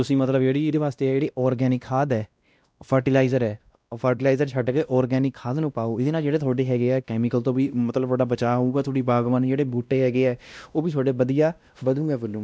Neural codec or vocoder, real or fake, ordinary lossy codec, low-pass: codec, 16 kHz, 1 kbps, X-Codec, WavLM features, trained on Multilingual LibriSpeech; fake; none; none